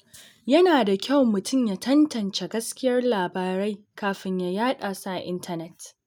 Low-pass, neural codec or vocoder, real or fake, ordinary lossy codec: 19.8 kHz; none; real; none